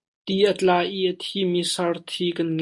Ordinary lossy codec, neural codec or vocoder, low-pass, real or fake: MP3, 48 kbps; none; 10.8 kHz; real